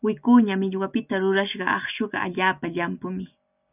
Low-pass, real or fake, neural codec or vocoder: 3.6 kHz; real; none